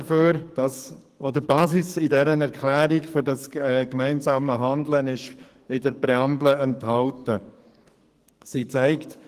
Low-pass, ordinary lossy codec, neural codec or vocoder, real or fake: 14.4 kHz; Opus, 24 kbps; codec, 44.1 kHz, 2.6 kbps, SNAC; fake